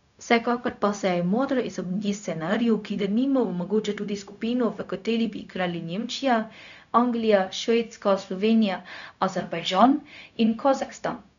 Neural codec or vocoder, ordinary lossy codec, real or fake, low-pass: codec, 16 kHz, 0.4 kbps, LongCat-Audio-Codec; none; fake; 7.2 kHz